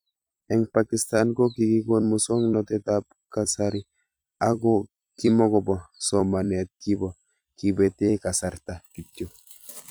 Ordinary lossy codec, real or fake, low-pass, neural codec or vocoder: none; fake; none; vocoder, 44.1 kHz, 128 mel bands every 256 samples, BigVGAN v2